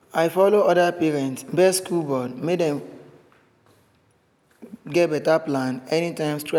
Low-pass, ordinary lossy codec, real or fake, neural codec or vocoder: 19.8 kHz; none; real; none